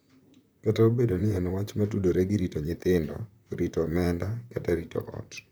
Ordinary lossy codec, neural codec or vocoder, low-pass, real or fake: none; vocoder, 44.1 kHz, 128 mel bands, Pupu-Vocoder; none; fake